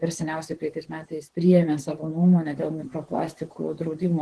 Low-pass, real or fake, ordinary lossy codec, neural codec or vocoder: 10.8 kHz; fake; Opus, 16 kbps; vocoder, 44.1 kHz, 128 mel bands, Pupu-Vocoder